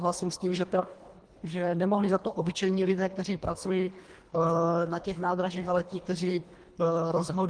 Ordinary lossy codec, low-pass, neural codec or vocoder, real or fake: Opus, 24 kbps; 9.9 kHz; codec, 24 kHz, 1.5 kbps, HILCodec; fake